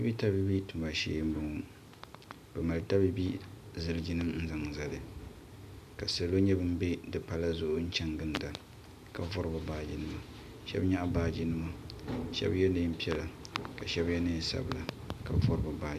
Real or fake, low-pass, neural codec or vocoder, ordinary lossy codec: real; 14.4 kHz; none; MP3, 96 kbps